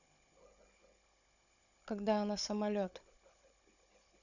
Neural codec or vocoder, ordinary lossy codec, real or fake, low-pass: codec, 16 kHz, 16 kbps, FunCodec, trained on Chinese and English, 50 frames a second; none; fake; 7.2 kHz